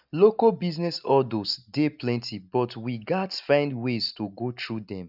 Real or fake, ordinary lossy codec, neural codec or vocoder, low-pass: real; none; none; 5.4 kHz